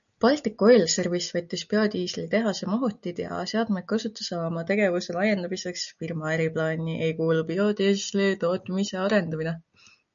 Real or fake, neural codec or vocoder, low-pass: real; none; 7.2 kHz